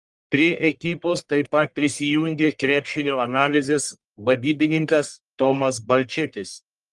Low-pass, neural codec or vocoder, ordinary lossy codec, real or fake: 10.8 kHz; codec, 44.1 kHz, 1.7 kbps, Pupu-Codec; Opus, 32 kbps; fake